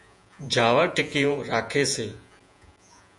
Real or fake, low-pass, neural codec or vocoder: fake; 10.8 kHz; vocoder, 48 kHz, 128 mel bands, Vocos